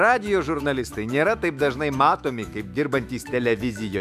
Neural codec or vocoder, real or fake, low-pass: autoencoder, 48 kHz, 128 numbers a frame, DAC-VAE, trained on Japanese speech; fake; 14.4 kHz